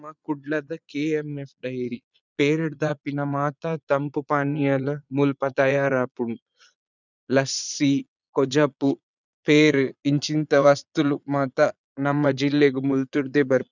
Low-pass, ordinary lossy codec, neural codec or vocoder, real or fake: 7.2 kHz; none; vocoder, 22.05 kHz, 80 mel bands, Vocos; fake